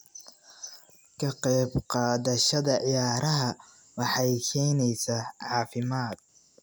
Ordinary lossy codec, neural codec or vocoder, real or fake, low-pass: none; none; real; none